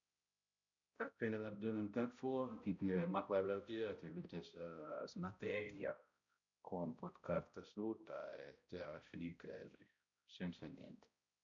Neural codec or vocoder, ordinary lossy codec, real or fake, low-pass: codec, 16 kHz, 0.5 kbps, X-Codec, HuBERT features, trained on balanced general audio; MP3, 96 kbps; fake; 7.2 kHz